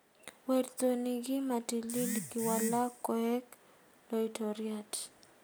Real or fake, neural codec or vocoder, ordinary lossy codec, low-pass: real; none; none; none